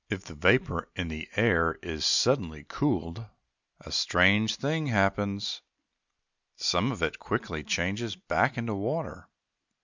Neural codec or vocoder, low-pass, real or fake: none; 7.2 kHz; real